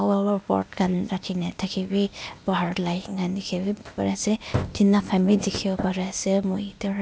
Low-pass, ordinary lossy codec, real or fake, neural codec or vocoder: none; none; fake; codec, 16 kHz, 0.8 kbps, ZipCodec